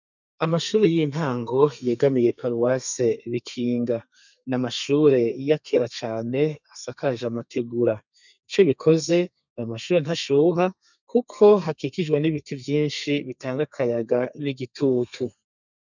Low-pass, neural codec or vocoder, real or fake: 7.2 kHz; codec, 32 kHz, 1.9 kbps, SNAC; fake